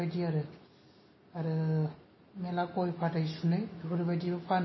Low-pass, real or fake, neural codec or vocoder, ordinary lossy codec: 7.2 kHz; real; none; MP3, 24 kbps